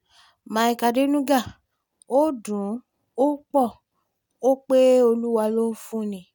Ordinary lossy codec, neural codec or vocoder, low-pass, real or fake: none; none; none; real